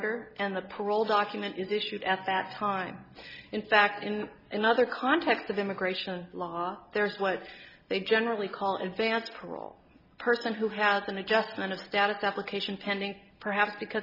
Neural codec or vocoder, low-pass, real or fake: none; 5.4 kHz; real